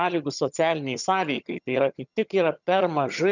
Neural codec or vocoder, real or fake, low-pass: vocoder, 22.05 kHz, 80 mel bands, HiFi-GAN; fake; 7.2 kHz